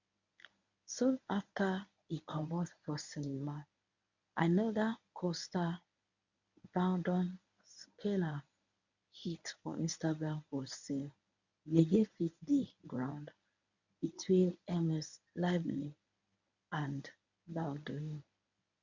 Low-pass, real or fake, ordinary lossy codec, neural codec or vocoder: 7.2 kHz; fake; none; codec, 24 kHz, 0.9 kbps, WavTokenizer, medium speech release version 1